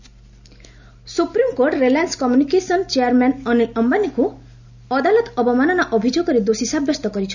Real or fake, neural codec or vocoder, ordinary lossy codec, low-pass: real; none; none; 7.2 kHz